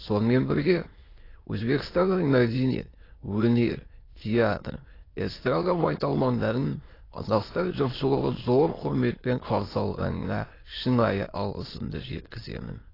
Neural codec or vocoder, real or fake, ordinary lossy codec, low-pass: autoencoder, 22.05 kHz, a latent of 192 numbers a frame, VITS, trained on many speakers; fake; AAC, 24 kbps; 5.4 kHz